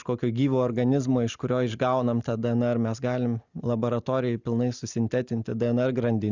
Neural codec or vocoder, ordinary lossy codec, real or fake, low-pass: none; Opus, 64 kbps; real; 7.2 kHz